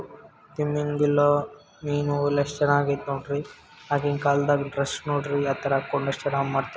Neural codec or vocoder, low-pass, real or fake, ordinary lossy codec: none; none; real; none